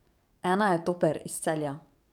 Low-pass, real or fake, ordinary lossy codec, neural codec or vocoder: 19.8 kHz; fake; none; codec, 44.1 kHz, 7.8 kbps, DAC